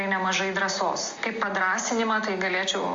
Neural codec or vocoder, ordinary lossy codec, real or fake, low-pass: none; Opus, 32 kbps; real; 7.2 kHz